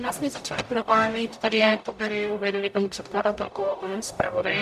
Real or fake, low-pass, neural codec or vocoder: fake; 14.4 kHz; codec, 44.1 kHz, 0.9 kbps, DAC